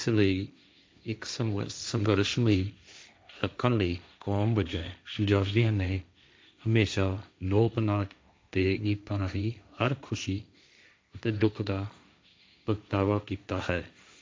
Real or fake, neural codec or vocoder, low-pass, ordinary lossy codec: fake; codec, 16 kHz, 1.1 kbps, Voila-Tokenizer; none; none